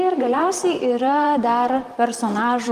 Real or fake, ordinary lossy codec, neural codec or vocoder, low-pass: fake; Opus, 32 kbps; vocoder, 44.1 kHz, 128 mel bands, Pupu-Vocoder; 14.4 kHz